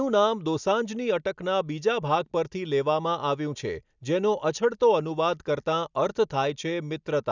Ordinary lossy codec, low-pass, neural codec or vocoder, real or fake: none; 7.2 kHz; none; real